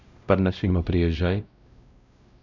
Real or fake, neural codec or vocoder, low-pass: fake; codec, 16 kHz, 0.5 kbps, X-Codec, WavLM features, trained on Multilingual LibriSpeech; 7.2 kHz